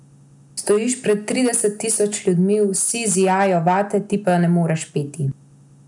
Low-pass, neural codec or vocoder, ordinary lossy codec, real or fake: 10.8 kHz; none; none; real